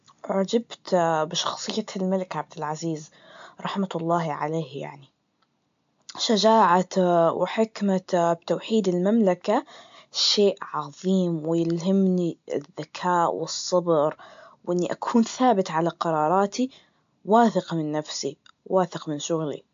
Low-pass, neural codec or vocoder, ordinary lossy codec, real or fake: 7.2 kHz; none; AAC, 64 kbps; real